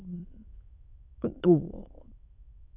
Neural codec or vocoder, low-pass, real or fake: autoencoder, 22.05 kHz, a latent of 192 numbers a frame, VITS, trained on many speakers; 3.6 kHz; fake